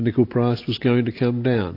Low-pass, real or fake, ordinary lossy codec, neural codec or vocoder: 5.4 kHz; real; AAC, 32 kbps; none